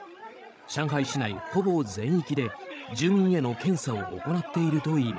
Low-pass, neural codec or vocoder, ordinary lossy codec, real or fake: none; codec, 16 kHz, 16 kbps, FreqCodec, larger model; none; fake